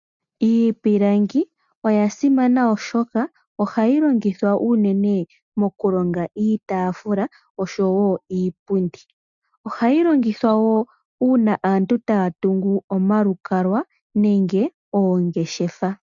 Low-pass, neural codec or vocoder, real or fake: 7.2 kHz; none; real